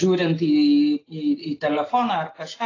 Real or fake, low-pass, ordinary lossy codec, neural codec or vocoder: real; 7.2 kHz; AAC, 32 kbps; none